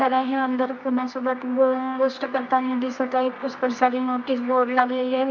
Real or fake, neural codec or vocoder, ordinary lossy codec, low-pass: fake; codec, 24 kHz, 0.9 kbps, WavTokenizer, medium music audio release; MP3, 64 kbps; 7.2 kHz